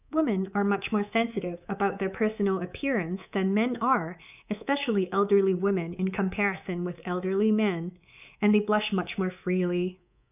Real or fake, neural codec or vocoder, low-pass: fake; codec, 24 kHz, 3.1 kbps, DualCodec; 3.6 kHz